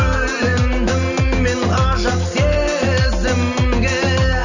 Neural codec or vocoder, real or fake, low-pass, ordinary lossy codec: none; real; 7.2 kHz; none